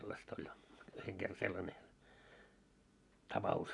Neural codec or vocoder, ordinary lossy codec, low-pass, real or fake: none; AAC, 64 kbps; 10.8 kHz; real